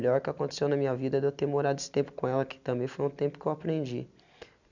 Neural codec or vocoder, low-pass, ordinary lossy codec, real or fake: none; 7.2 kHz; none; real